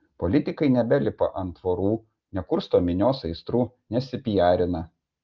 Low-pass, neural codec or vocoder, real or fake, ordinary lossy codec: 7.2 kHz; none; real; Opus, 32 kbps